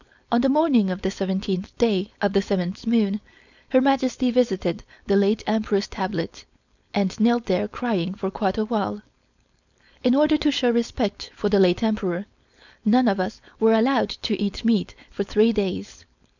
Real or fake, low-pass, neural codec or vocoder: fake; 7.2 kHz; codec, 16 kHz, 4.8 kbps, FACodec